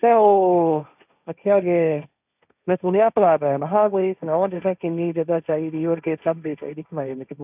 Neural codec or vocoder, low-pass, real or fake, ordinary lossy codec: codec, 16 kHz, 1.1 kbps, Voila-Tokenizer; 3.6 kHz; fake; none